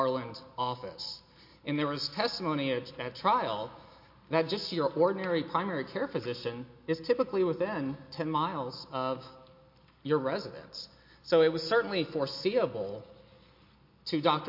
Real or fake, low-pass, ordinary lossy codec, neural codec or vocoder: real; 5.4 kHz; MP3, 32 kbps; none